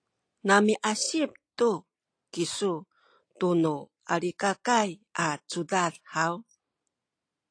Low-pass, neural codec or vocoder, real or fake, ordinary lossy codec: 9.9 kHz; none; real; AAC, 48 kbps